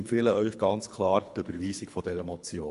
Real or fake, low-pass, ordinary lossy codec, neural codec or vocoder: fake; 10.8 kHz; AAC, 64 kbps; codec, 24 kHz, 3 kbps, HILCodec